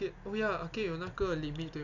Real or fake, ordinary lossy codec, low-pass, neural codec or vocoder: real; none; 7.2 kHz; none